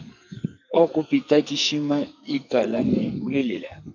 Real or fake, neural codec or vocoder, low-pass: fake; codec, 44.1 kHz, 2.6 kbps, SNAC; 7.2 kHz